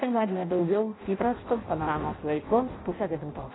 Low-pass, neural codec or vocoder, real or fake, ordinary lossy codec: 7.2 kHz; codec, 16 kHz in and 24 kHz out, 0.6 kbps, FireRedTTS-2 codec; fake; AAC, 16 kbps